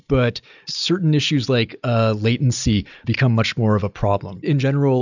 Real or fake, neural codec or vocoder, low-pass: real; none; 7.2 kHz